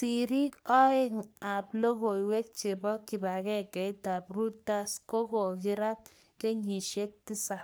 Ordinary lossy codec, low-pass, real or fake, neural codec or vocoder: none; none; fake; codec, 44.1 kHz, 3.4 kbps, Pupu-Codec